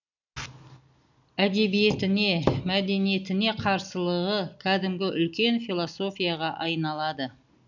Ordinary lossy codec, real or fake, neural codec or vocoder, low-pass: none; real; none; 7.2 kHz